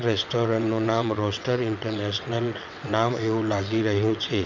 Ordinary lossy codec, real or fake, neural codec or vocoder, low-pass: none; fake; vocoder, 22.05 kHz, 80 mel bands, WaveNeXt; 7.2 kHz